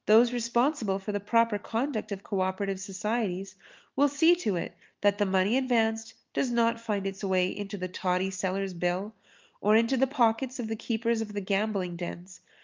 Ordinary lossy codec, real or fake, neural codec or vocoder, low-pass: Opus, 24 kbps; real; none; 7.2 kHz